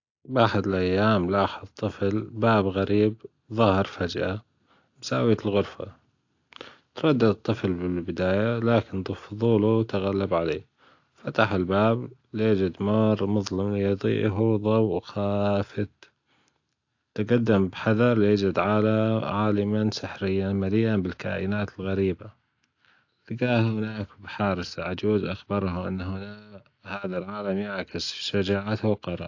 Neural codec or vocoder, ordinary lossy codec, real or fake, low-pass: none; AAC, 48 kbps; real; 7.2 kHz